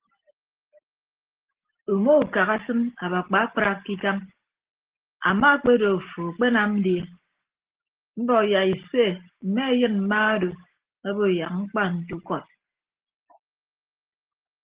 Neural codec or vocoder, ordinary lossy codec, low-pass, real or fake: none; Opus, 16 kbps; 3.6 kHz; real